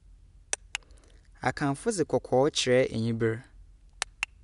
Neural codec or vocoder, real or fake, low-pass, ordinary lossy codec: none; real; 10.8 kHz; none